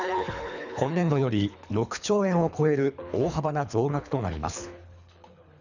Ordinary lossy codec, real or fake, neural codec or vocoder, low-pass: none; fake; codec, 24 kHz, 3 kbps, HILCodec; 7.2 kHz